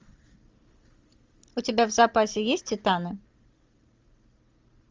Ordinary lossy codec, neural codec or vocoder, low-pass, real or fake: Opus, 32 kbps; none; 7.2 kHz; real